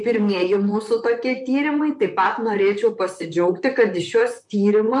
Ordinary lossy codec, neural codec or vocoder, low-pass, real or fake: MP3, 64 kbps; vocoder, 44.1 kHz, 128 mel bands, Pupu-Vocoder; 10.8 kHz; fake